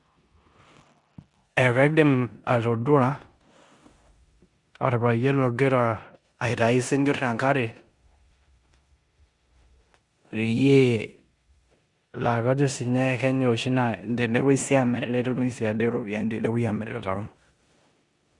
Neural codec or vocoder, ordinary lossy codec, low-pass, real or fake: codec, 16 kHz in and 24 kHz out, 0.9 kbps, LongCat-Audio-Codec, four codebook decoder; Opus, 64 kbps; 10.8 kHz; fake